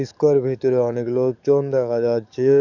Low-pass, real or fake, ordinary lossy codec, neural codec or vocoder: 7.2 kHz; fake; none; codec, 44.1 kHz, 7.8 kbps, DAC